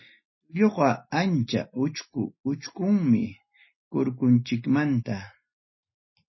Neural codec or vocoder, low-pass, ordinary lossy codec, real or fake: none; 7.2 kHz; MP3, 24 kbps; real